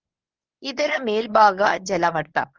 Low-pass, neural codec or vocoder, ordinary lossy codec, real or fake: 7.2 kHz; codec, 24 kHz, 0.9 kbps, WavTokenizer, medium speech release version 1; Opus, 24 kbps; fake